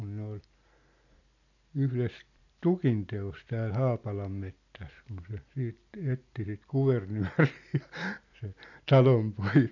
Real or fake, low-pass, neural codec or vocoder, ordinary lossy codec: real; 7.2 kHz; none; none